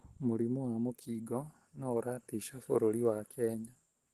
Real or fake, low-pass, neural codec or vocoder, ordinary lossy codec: real; 14.4 kHz; none; Opus, 16 kbps